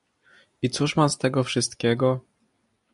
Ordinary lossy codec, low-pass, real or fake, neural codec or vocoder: MP3, 64 kbps; 10.8 kHz; real; none